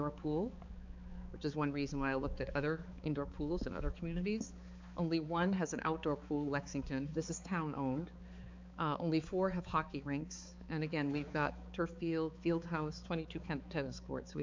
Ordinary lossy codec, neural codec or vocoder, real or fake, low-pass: MP3, 64 kbps; codec, 16 kHz, 4 kbps, X-Codec, HuBERT features, trained on balanced general audio; fake; 7.2 kHz